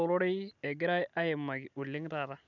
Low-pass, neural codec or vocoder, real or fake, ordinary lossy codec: 7.2 kHz; none; real; none